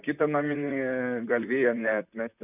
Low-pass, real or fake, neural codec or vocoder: 3.6 kHz; fake; vocoder, 44.1 kHz, 128 mel bands, Pupu-Vocoder